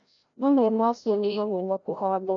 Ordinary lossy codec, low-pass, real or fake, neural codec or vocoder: none; 7.2 kHz; fake; codec, 16 kHz, 0.5 kbps, FreqCodec, larger model